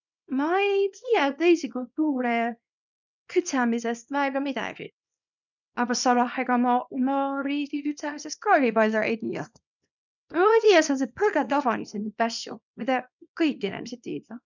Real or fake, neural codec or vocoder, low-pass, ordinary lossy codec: fake; codec, 24 kHz, 0.9 kbps, WavTokenizer, small release; 7.2 kHz; none